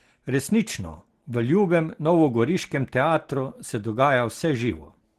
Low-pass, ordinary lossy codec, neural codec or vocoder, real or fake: 14.4 kHz; Opus, 16 kbps; none; real